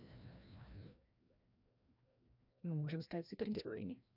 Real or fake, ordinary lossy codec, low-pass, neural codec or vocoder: fake; none; 5.4 kHz; codec, 16 kHz, 1 kbps, FreqCodec, larger model